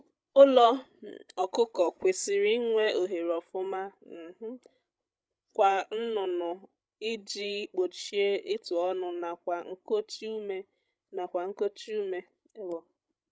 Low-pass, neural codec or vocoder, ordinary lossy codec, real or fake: none; codec, 16 kHz, 16 kbps, FreqCodec, larger model; none; fake